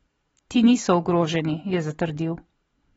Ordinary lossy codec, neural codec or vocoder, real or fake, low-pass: AAC, 24 kbps; vocoder, 44.1 kHz, 128 mel bands every 512 samples, BigVGAN v2; fake; 19.8 kHz